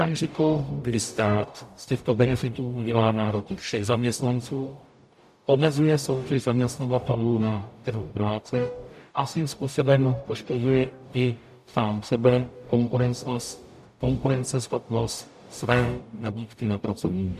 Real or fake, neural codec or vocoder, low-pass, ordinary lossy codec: fake; codec, 44.1 kHz, 0.9 kbps, DAC; 14.4 kHz; MP3, 96 kbps